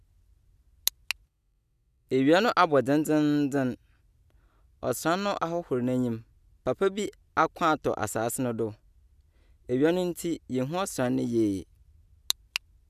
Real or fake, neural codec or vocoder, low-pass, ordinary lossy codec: real; none; 14.4 kHz; none